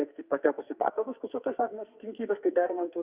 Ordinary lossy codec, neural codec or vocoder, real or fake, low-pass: Opus, 64 kbps; codec, 44.1 kHz, 2.6 kbps, SNAC; fake; 3.6 kHz